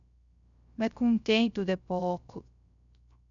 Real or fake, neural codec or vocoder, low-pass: fake; codec, 16 kHz, 0.3 kbps, FocalCodec; 7.2 kHz